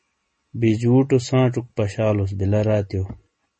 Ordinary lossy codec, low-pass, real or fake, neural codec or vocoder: MP3, 32 kbps; 10.8 kHz; real; none